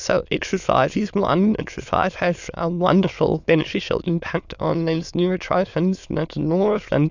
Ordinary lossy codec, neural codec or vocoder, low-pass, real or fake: Opus, 64 kbps; autoencoder, 22.05 kHz, a latent of 192 numbers a frame, VITS, trained on many speakers; 7.2 kHz; fake